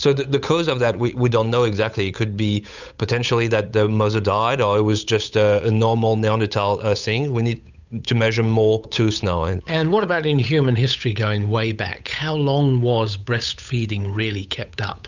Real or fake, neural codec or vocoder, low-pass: fake; codec, 16 kHz, 8 kbps, FunCodec, trained on Chinese and English, 25 frames a second; 7.2 kHz